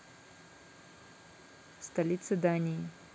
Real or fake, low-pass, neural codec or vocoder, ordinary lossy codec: real; none; none; none